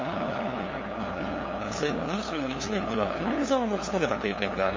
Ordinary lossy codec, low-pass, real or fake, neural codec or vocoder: MP3, 32 kbps; 7.2 kHz; fake; codec, 16 kHz, 2 kbps, FunCodec, trained on LibriTTS, 25 frames a second